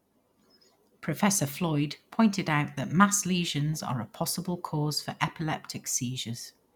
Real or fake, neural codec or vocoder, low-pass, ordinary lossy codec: real; none; 19.8 kHz; none